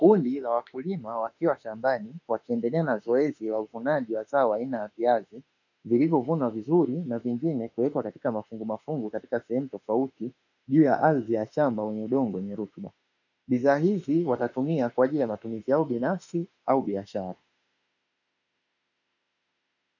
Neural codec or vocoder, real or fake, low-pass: autoencoder, 48 kHz, 32 numbers a frame, DAC-VAE, trained on Japanese speech; fake; 7.2 kHz